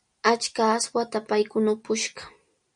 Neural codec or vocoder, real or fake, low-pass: none; real; 9.9 kHz